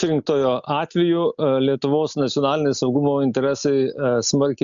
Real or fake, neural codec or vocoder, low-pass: real; none; 7.2 kHz